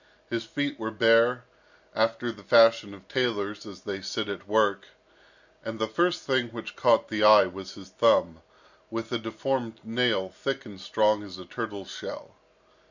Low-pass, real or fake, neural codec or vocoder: 7.2 kHz; real; none